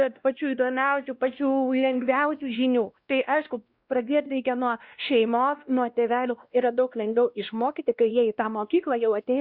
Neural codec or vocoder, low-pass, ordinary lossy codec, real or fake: codec, 16 kHz, 1 kbps, X-Codec, WavLM features, trained on Multilingual LibriSpeech; 5.4 kHz; Opus, 64 kbps; fake